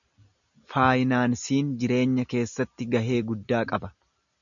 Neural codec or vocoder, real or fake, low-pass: none; real; 7.2 kHz